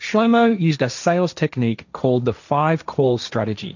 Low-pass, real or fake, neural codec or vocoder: 7.2 kHz; fake; codec, 16 kHz, 1.1 kbps, Voila-Tokenizer